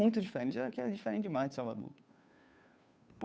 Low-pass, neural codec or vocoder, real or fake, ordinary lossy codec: none; codec, 16 kHz, 2 kbps, FunCodec, trained on Chinese and English, 25 frames a second; fake; none